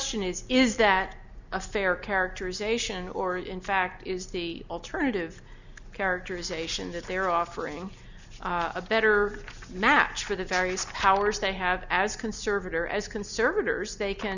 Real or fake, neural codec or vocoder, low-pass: real; none; 7.2 kHz